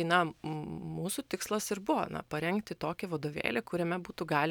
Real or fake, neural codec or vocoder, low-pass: real; none; 19.8 kHz